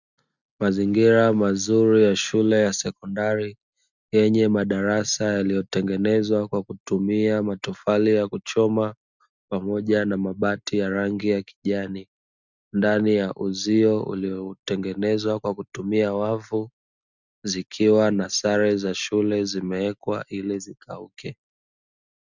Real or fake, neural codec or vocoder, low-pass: real; none; 7.2 kHz